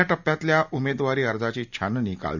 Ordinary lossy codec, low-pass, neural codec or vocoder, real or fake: none; 7.2 kHz; none; real